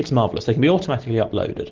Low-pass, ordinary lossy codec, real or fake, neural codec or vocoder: 7.2 kHz; Opus, 16 kbps; real; none